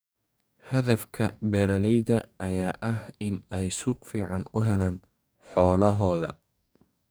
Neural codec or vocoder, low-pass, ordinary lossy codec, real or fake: codec, 44.1 kHz, 2.6 kbps, DAC; none; none; fake